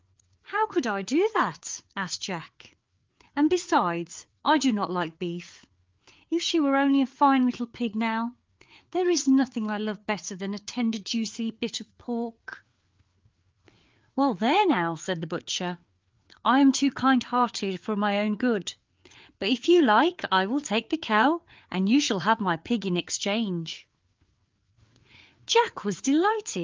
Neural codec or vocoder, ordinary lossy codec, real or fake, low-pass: codec, 16 kHz, 4 kbps, FreqCodec, larger model; Opus, 32 kbps; fake; 7.2 kHz